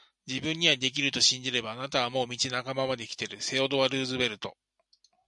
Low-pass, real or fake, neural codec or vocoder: 10.8 kHz; real; none